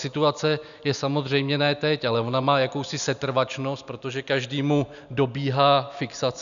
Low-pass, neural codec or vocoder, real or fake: 7.2 kHz; none; real